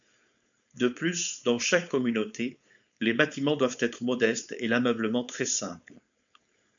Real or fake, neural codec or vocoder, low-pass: fake; codec, 16 kHz, 4.8 kbps, FACodec; 7.2 kHz